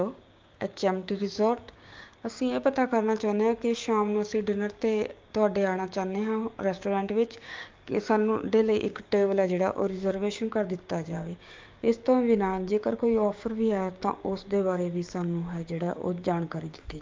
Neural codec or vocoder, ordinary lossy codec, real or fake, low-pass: codec, 16 kHz, 6 kbps, DAC; Opus, 24 kbps; fake; 7.2 kHz